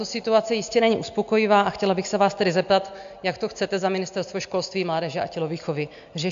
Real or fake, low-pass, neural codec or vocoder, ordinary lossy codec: real; 7.2 kHz; none; AAC, 64 kbps